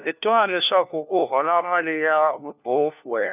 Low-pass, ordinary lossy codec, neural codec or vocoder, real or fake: 3.6 kHz; none; codec, 16 kHz, 1 kbps, FunCodec, trained on LibriTTS, 50 frames a second; fake